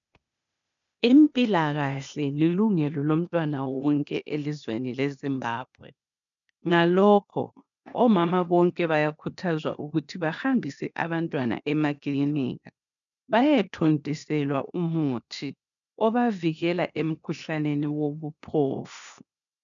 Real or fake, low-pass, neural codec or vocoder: fake; 7.2 kHz; codec, 16 kHz, 0.8 kbps, ZipCodec